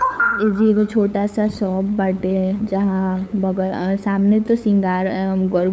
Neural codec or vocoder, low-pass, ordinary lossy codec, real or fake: codec, 16 kHz, 8 kbps, FunCodec, trained on LibriTTS, 25 frames a second; none; none; fake